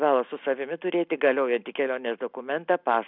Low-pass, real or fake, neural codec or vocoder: 5.4 kHz; real; none